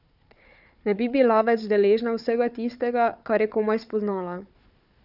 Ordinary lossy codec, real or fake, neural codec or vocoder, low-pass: none; fake; codec, 16 kHz, 4 kbps, FunCodec, trained on Chinese and English, 50 frames a second; 5.4 kHz